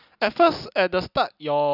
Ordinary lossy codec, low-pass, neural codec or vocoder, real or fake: none; 5.4 kHz; none; real